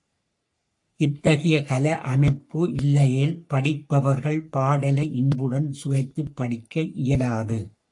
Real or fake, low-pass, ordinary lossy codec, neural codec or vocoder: fake; 10.8 kHz; AAC, 64 kbps; codec, 44.1 kHz, 3.4 kbps, Pupu-Codec